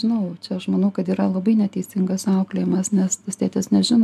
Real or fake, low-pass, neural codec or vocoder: real; 14.4 kHz; none